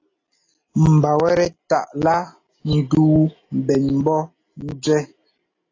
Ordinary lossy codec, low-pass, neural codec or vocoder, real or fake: AAC, 32 kbps; 7.2 kHz; none; real